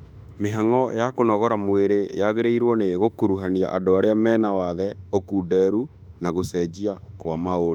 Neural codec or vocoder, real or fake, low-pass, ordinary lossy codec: autoencoder, 48 kHz, 32 numbers a frame, DAC-VAE, trained on Japanese speech; fake; 19.8 kHz; none